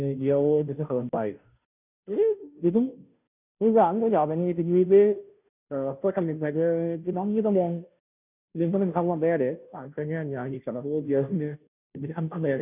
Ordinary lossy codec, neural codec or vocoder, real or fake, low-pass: none; codec, 16 kHz, 0.5 kbps, FunCodec, trained on Chinese and English, 25 frames a second; fake; 3.6 kHz